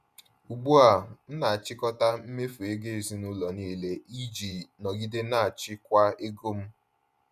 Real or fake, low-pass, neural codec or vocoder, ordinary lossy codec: fake; 14.4 kHz; vocoder, 48 kHz, 128 mel bands, Vocos; none